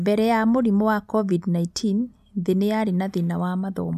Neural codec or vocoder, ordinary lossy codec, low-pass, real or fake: none; none; 14.4 kHz; real